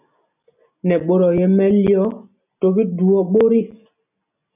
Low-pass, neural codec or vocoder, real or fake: 3.6 kHz; none; real